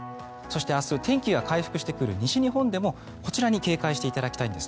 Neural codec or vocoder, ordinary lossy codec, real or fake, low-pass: none; none; real; none